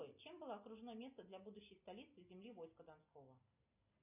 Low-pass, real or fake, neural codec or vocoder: 3.6 kHz; real; none